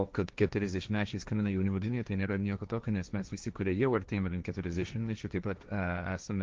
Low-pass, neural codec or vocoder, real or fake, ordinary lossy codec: 7.2 kHz; codec, 16 kHz, 1.1 kbps, Voila-Tokenizer; fake; Opus, 24 kbps